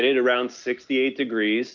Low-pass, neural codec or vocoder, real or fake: 7.2 kHz; none; real